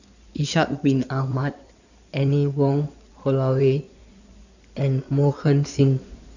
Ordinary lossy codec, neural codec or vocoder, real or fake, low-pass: none; codec, 16 kHz in and 24 kHz out, 2.2 kbps, FireRedTTS-2 codec; fake; 7.2 kHz